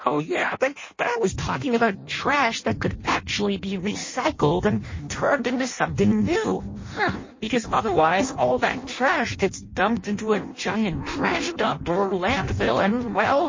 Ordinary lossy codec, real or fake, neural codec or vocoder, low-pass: MP3, 32 kbps; fake; codec, 16 kHz in and 24 kHz out, 0.6 kbps, FireRedTTS-2 codec; 7.2 kHz